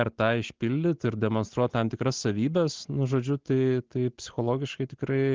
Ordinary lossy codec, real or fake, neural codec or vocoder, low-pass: Opus, 16 kbps; real; none; 7.2 kHz